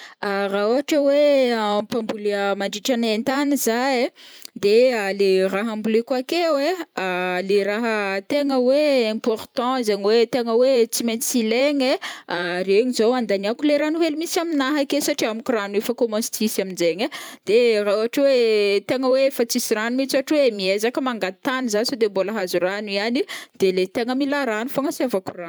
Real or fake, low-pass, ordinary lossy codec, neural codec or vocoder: fake; none; none; vocoder, 44.1 kHz, 128 mel bands every 512 samples, BigVGAN v2